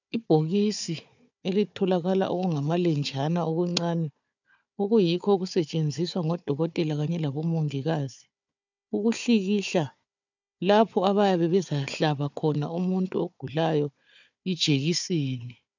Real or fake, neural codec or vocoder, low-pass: fake; codec, 16 kHz, 4 kbps, FunCodec, trained on Chinese and English, 50 frames a second; 7.2 kHz